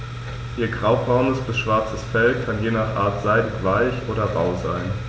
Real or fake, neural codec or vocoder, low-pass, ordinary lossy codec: real; none; none; none